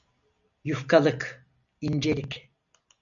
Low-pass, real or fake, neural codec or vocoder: 7.2 kHz; real; none